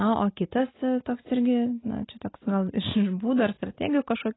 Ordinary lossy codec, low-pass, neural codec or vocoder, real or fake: AAC, 16 kbps; 7.2 kHz; none; real